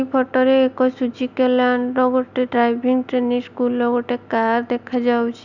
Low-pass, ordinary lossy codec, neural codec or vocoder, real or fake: 7.2 kHz; Opus, 64 kbps; none; real